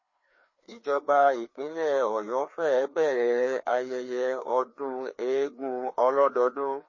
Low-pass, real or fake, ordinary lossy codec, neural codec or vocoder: 7.2 kHz; fake; MP3, 32 kbps; codec, 16 kHz, 2 kbps, FreqCodec, larger model